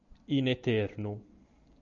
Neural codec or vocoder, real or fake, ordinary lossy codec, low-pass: none; real; MP3, 64 kbps; 7.2 kHz